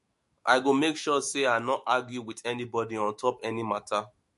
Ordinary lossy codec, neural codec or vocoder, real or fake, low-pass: MP3, 48 kbps; autoencoder, 48 kHz, 128 numbers a frame, DAC-VAE, trained on Japanese speech; fake; 14.4 kHz